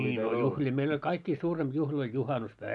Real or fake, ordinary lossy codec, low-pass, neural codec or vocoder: real; none; 10.8 kHz; none